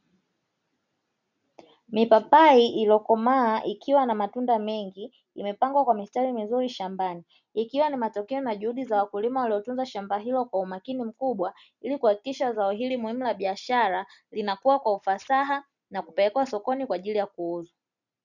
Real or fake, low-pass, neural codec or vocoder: real; 7.2 kHz; none